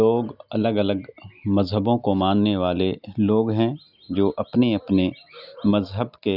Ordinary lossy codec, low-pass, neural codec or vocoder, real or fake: none; 5.4 kHz; none; real